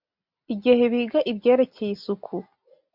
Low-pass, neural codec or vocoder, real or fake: 5.4 kHz; none; real